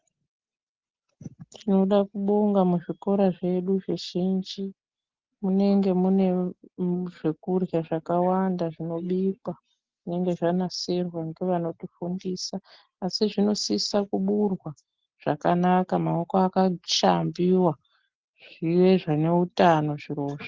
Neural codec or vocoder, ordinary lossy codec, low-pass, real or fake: none; Opus, 16 kbps; 7.2 kHz; real